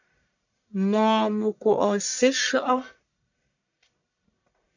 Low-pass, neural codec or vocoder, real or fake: 7.2 kHz; codec, 44.1 kHz, 1.7 kbps, Pupu-Codec; fake